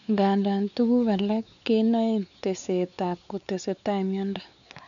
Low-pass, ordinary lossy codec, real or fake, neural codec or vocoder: 7.2 kHz; none; fake; codec, 16 kHz, 4 kbps, X-Codec, WavLM features, trained on Multilingual LibriSpeech